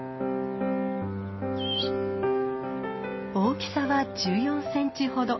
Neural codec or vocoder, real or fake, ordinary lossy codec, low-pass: none; real; MP3, 24 kbps; 7.2 kHz